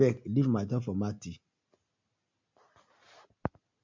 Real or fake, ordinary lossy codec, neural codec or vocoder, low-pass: real; MP3, 64 kbps; none; 7.2 kHz